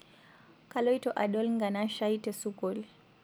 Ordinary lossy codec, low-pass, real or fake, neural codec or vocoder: none; none; real; none